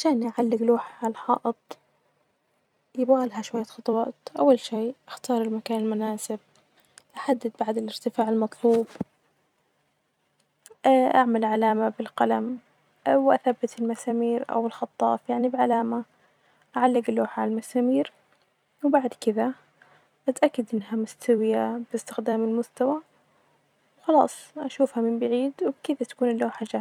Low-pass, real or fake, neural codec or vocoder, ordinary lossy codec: 19.8 kHz; fake; vocoder, 44.1 kHz, 128 mel bands every 256 samples, BigVGAN v2; none